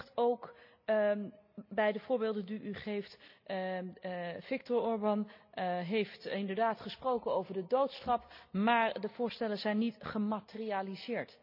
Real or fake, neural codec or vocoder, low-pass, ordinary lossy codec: real; none; 5.4 kHz; none